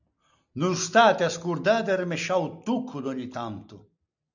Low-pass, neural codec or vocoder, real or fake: 7.2 kHz; none; real